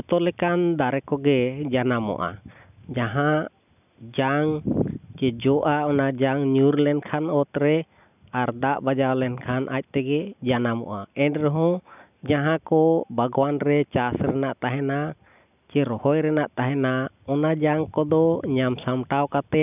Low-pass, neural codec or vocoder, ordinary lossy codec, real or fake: 3.6 kHz; none; none; real